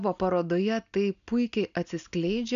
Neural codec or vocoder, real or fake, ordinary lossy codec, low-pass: none; real; MP3, 96 kbps; 7.2 kHz